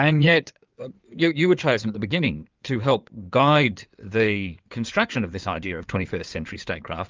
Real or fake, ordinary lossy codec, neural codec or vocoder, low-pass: fake; Opus, 24 kbps; codec, 16 kHz in and 24 kHz out, 2.2 kbps, FireRedTTS-2 codec; 7.2 kHz